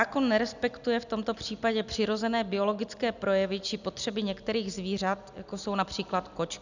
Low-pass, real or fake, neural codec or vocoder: 7.2 kHz; real; none